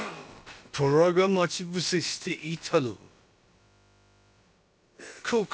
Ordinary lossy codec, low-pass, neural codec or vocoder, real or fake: none; none; codec, 16 kHz, about 1 kbps, DyCAST, with the encoder's durations; fake